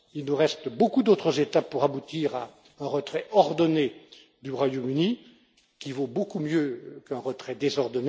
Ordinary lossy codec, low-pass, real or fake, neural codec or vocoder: none; none; real; none